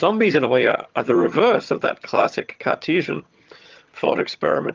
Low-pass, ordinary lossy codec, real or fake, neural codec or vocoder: 7.2 kHz; Opus, 24 kbps; fake; vocoder, 22.05 kHz, 80 mel bands, HiFi-GAN